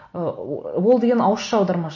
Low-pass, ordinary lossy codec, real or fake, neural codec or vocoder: 7.2 kHz; MP3, 32 kbps; real; none